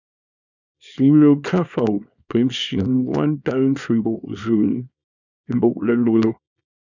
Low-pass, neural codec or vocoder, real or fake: 7.2 kHz; codec, 24 kHz, 0.9 kbps, WavTokenizer, small release; fake